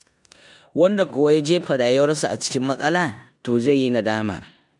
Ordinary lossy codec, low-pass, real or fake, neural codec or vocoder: none; 10.8 kHz; fake; codec, 16 kHz in and 24 kHz out, 0.9 kbps, LongCat-Audio-Codec, four codebook decoder